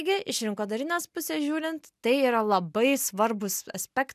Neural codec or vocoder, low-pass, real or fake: none; 14.4 kHz; real